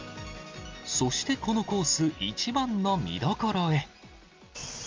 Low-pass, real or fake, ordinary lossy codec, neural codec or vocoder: 7.2 kHz; real; Opus, 32 kbps; none